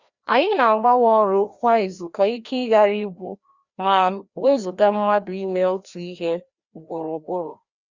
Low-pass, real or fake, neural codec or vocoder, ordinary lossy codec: 7.2 kHz; fake; codec, 16 kHz, 1 kbps, FreqCodec, larger model; Opus, 64 kbps